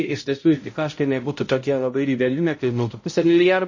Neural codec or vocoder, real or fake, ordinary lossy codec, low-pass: codec, 16 kHz, 0.5 kbps, X-Codec, HuBERT features, trained on balanced general audio; fake; MP3, 32 kbps; 7.2 kHz